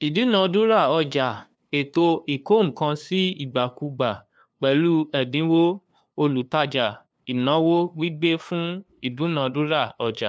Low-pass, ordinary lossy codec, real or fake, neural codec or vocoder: none; none; fake; codec, 16 kHz, 2 kbps, FunCodec, trained on LibriTTS, 25 frames a second